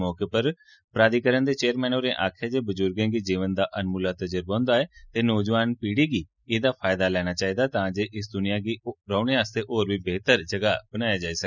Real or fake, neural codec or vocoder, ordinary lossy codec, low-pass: real; none; none; 7.2 kHz